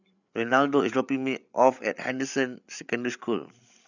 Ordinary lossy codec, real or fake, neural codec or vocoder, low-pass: none; fake; codec, 16 kHz, 8 kbps, FreqCodec, larger model; 7.2 kHz